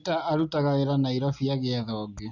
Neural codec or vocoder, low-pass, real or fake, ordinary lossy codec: none; 7.2 kHz; real; none